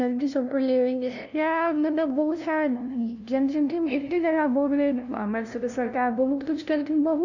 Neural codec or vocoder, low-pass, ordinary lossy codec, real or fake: codec, 16 kHz, 0.5 kbps, FunCodec, trained on LibriTTS, 25 frames a second; 7.2 kHz; none; fake